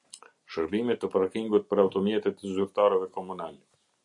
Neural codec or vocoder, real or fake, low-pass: none; real; 10.8 kHz